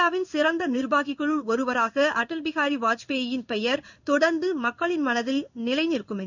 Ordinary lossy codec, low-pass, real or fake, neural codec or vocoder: none; 7.2 kHz; fake; codec, 16 kHz in and 24 kHz out, 1 kbps, XY-Tokenizer